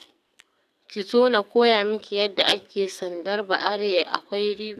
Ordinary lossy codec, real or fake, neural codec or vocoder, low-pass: none; fake; codec, 44.1 kHz, 2.6 kbps, SNAC; 14.4 kHz